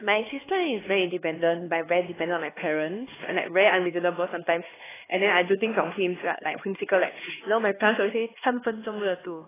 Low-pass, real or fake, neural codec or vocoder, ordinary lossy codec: 3.6 kHz; fake; codec, 16 kHz, 2 kbps, X-Codec, HuBERT features, trained on LibriSpeech; AAC, 16 kbps